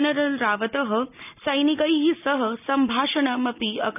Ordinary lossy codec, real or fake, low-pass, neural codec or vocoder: none; real; 3.6 kHz; none